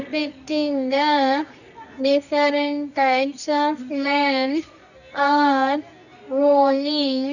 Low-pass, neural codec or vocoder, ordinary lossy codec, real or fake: 7.2 kHz; codec, 24 kHz, 0.9 kbps, WavTokenizer, medium music audio release; none; fake